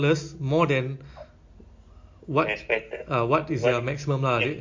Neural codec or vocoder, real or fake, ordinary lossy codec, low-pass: none; real; MP3, 48 kbps; 7.2 kHz